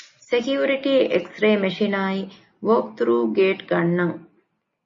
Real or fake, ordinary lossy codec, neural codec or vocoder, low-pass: real; MP3, 32 kbps; none; 7.2 kHz